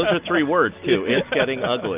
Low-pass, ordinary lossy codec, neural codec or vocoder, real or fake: 3.6 kHz; Opus, 24 kbps; none; real